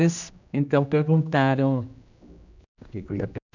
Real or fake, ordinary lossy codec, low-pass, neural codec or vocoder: fake; none; 7.2 kHz; codec, 16 kHz, 1 kbps, X-Codec, HuBERT features, trained on general audio